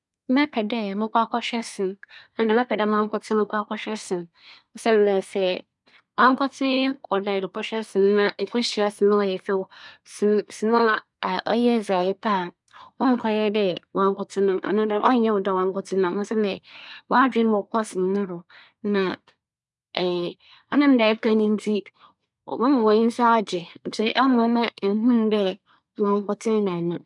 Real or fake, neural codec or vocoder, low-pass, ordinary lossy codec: fake; codec, 24 kHz, 1 kbps, SNAC; 10.8 kHz; none